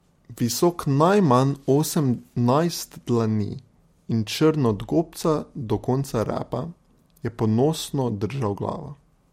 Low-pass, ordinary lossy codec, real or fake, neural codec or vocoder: 19.8 kHz; MP3, 64 kbps; real; none